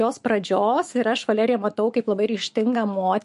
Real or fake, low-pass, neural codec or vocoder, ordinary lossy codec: fake; 14.4 kHz; autoencoder, 48 kHz, 128 numbers a frame, DAC-VAE, trained on Japanese speech; MP3, 48 kbps